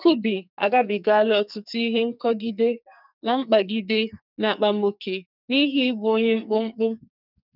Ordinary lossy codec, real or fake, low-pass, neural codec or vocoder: none; fake; 5.4 kHz; codec, 44.1 kHz, 2.6 kbps, SNAC